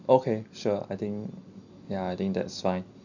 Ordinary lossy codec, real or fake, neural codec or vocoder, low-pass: none; real; none; 7.2 kHz